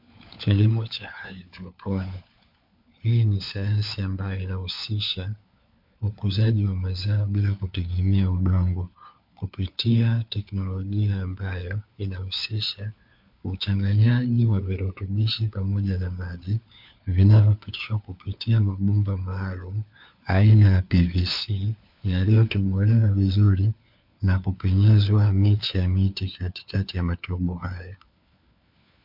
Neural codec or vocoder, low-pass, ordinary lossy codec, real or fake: codec, 16 kHz, 4 kbps, FunCodec, trained on LibriTTS, 50 frames a second; 5.4 kHz; MP3, 48 kbps; fake